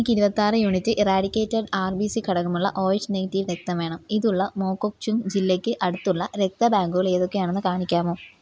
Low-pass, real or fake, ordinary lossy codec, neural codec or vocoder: none; real; none; none